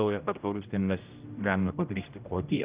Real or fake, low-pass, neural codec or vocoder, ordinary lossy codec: fake; 3.6 kHz; codec, 16 kHz, 0.5 kbps, X-Codec, HuBERT features, trained on general audio; Opus, 24 kbps